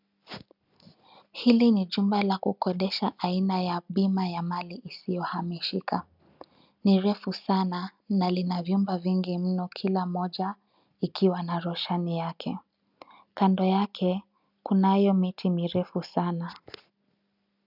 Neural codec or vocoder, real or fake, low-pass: none; real; 5.4 kHz